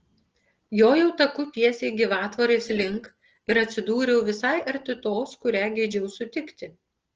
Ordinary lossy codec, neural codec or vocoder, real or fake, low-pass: Opus, 16 kbps; none; real; 7.2 kHz